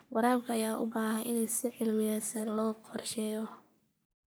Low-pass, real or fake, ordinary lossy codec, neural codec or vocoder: none; fake; none; codec, 44.1 kHz, 3.4 kbps, Pupu-Codec